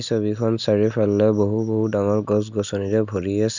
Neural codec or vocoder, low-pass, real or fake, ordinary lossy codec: none; 7.2 kHz; real; none